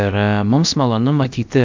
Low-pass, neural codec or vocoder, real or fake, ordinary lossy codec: 7.2 kHz; codec, 16 kHz, about 1 kbps, DyCAST, with the encoder's durations; fake; MP3, 64 kbps